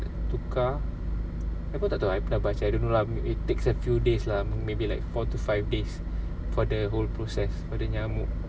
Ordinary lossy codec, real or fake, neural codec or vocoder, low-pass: none; real; none; none